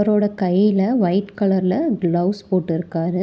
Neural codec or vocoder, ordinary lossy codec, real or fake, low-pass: none; none; real; none